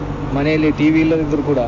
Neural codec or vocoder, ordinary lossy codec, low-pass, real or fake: none; AAC, 32 kbps; 7.2 kHz; real